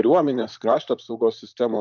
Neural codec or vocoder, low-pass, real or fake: vocoder, 44.1 kHz, 128 mel bands, Pupu-Vocoder; 7.2 kHz; fake